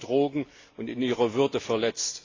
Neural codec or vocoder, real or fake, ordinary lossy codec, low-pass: none; real; AAC, 32 kbps; 7.2 kHz